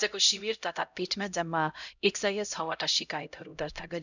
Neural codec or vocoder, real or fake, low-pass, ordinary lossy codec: codec, 16 kHz, 0.5 kbps, X-Codec, HuBERT features, trained on LibriSpeech; fake; 7.2 kHz; none